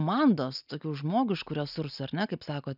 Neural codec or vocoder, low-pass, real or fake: none; 5.4 kHz; real